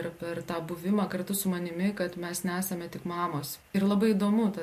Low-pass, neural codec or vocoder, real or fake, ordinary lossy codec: 14.4 kHz; none; real; MP3, 64 kbps